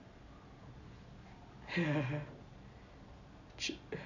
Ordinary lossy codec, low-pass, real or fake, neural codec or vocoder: none; 7.2 kHz; real; none